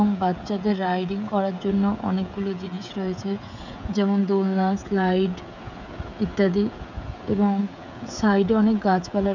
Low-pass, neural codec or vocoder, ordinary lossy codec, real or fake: 7.2 kHz; codec, 16 kHz, 16 kbps, FreqCodec, smaller model; none; fake